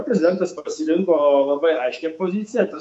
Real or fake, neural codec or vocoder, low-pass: fake; codec, 24 kHz, 3.1 kbps, DualCodec; 10.8 kHz